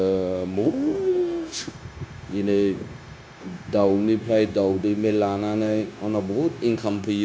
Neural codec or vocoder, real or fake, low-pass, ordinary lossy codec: codec, 16 kHz, 0.9 kbps, LongCat-Audio-Codec; fake; none; none